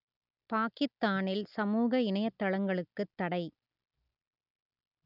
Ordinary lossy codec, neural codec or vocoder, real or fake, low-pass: none; none; real; 5.4 kHz